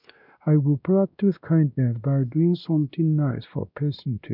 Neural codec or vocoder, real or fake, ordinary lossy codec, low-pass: codec, 16 kHz, 1 kbps, X-Codec, WavLM features, trained on Multilingual LibriSpeech; fake; none; 5.4 kHz